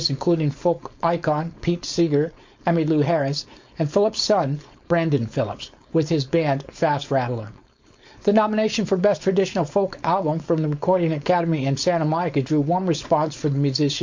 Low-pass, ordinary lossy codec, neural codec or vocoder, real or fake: 7.2 kHz; MP3, 48 kbps; codec, 16 kHz, 4.8 kbps, FACodec; fake